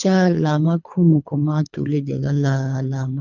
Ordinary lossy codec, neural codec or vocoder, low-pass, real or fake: none; codec, 24 kHz, 3 kbps, HILCodec; 7.2 kHz; fake